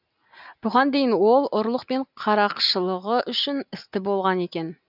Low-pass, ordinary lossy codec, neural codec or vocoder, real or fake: 5.4 kHz; none; none; real